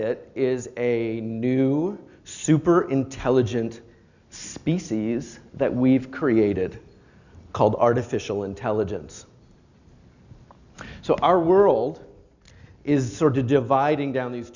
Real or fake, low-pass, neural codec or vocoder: real; 7.2 kHz; none